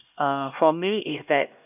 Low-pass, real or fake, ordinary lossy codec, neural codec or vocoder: 3.6 kHz; fake; none; codec, 16 kHz, 1 kbps, X-Codec, HuBERT features, trained on LibriSpeech